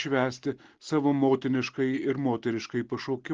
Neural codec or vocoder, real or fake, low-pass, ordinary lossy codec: none; real; 7.2 kHz; Opus, 16 kbps